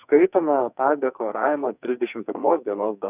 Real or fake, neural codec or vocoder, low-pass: fake; codec, 44.1 kHz, 2.6 kbps, SNAC; 3.6 kHz